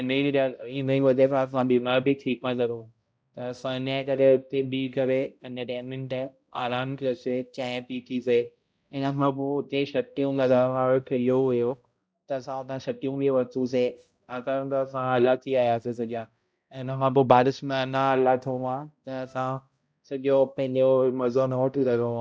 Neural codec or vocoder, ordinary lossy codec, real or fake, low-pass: codec, 16 kHz, 0.5 kbps, X-Codec, HuBERT features, trained on balanced general audio; none; fake; none